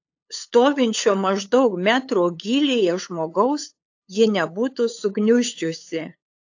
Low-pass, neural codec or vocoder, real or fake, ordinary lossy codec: 7.2 kHz; codec, 16 kHz, 8 kbps, FunCodec, trained on LibriTTS, 25 frames a second; fake; AAC, 48 kbps